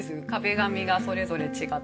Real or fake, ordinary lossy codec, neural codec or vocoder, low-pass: real; none; none; none